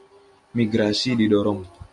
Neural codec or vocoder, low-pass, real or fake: none; 10.8 kHz; real